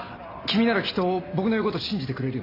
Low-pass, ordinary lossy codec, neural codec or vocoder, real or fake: 5.4 kHz; AAC, 32 kbps; none; real